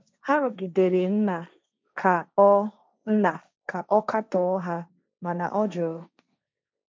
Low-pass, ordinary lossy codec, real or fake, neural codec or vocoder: none; none; fake; codec, 16 kHz, 1.1 kbps, Voila-Tokenizer